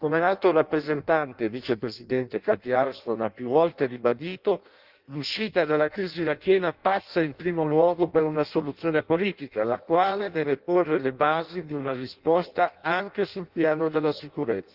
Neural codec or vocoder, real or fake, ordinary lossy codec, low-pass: codec, 16 kHz in and 24 kHz out, 0.6 kbps, FireRedTTS-2 codec; fake; Opus, 32 kbps; 5.4 kHz